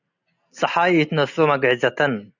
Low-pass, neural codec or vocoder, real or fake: 7.2 kHz; none; real